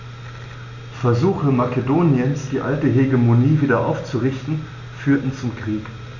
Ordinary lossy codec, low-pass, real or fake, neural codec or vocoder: none; 7.2 kHz; real; none